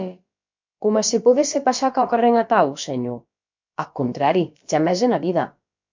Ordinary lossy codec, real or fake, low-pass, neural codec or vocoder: MP3, 48 kbps; fake; 7.2 kHz; codec, 16 kHz, about 1 kbps, DyCAST, with the encoder's durations